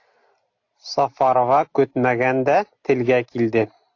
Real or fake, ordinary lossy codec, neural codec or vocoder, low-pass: real; AAC, 48 kbps; none; 7.2 kHz